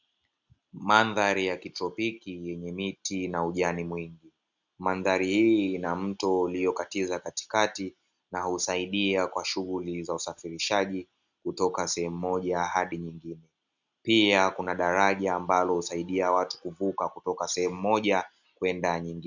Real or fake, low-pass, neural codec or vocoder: real; 7.2 kHz; none